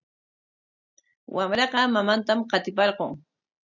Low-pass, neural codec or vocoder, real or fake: 7.2 kHz; none; real